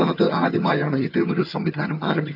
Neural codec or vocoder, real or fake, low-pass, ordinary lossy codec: vocoder, 22.05 kHz, 80 mel bands, HiFi-GAN; fake; 5.4 kHz; none